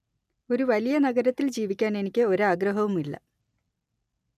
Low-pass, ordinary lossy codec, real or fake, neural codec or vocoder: 14.4 kHz; none; real; none